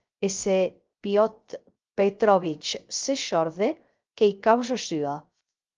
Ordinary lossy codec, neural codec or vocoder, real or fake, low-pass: Opus, 24 kbps; codec, 16 kHz, 0.3 kbps, FocalCodec; fake; 7.2 kHz